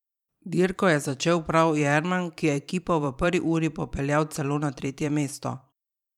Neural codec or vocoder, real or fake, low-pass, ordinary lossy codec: vocoder, 44.1 kHz, 128 mel bands every 512 samples, BigVGAN v2; fake; 19.8 kHz; none